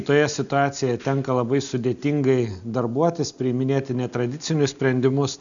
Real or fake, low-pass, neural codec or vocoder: real; 7.2 kHz; none